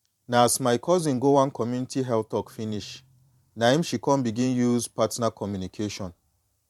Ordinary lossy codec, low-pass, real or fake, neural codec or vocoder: MP3, 96 kbps; 19.8 kHz; fake; vocoder, 44.1 kHz, 128 mel bands every 512 samples, BigVGAN v2